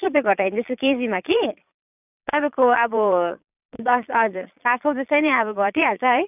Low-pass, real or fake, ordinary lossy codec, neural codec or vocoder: 3.6 kHz; fake; none; vocoder, 22.05 kHz, 80 mel bands, Vocos